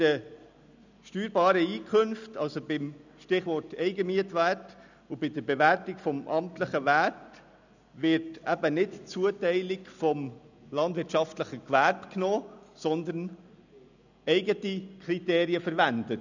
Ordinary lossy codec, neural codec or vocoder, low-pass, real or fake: none; none; 7.2 kHz; real